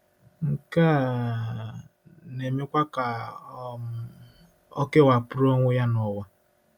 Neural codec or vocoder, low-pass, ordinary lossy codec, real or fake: none; 19.8 kHz; none; real